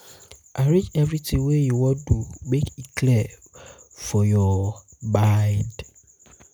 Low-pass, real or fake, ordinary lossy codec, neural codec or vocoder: none; real; none; none